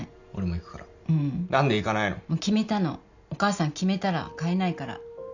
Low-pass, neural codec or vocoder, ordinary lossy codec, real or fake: 7.2 kHz; none; none; real